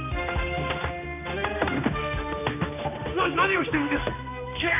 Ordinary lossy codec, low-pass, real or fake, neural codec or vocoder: none; 3.6 kHz; fake; codec, 16 kHz, 2 kbps, X-Codec, HuBERT features, trained on general audio